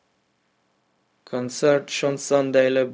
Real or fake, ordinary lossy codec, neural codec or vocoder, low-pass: fake; none; codec, 16 kHz, 0.4 kbps, LongCat-Audio-Codec; none